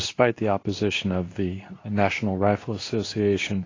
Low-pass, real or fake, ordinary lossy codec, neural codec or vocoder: 7.2 kHz; fake; AAC, 32 kbps; codec, 24 kHz, 0.9 kbps, WavTokenizer, medium speech release version 2